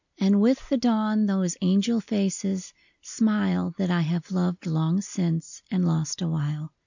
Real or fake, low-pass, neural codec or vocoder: real; 7.2 kHz; none